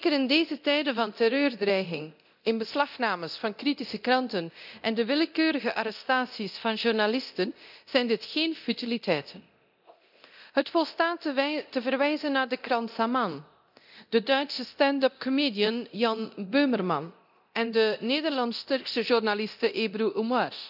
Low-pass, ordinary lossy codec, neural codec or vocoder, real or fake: 5.4 kHz; none; codec, 24 kHz, 0.9 kbps, DualCodec; fake